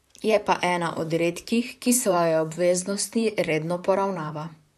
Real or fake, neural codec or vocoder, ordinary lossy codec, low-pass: fake; vocoder, 44.1 kHz, 128 mel bands, Pupu-Vocoder; none; 14.4 kHz